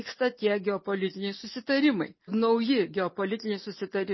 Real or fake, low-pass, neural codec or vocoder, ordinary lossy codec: real; 7.2 kHz; none; MP3, 24 kbps